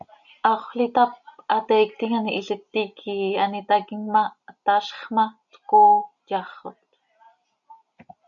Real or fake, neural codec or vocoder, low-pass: real; none; 7.2 kHz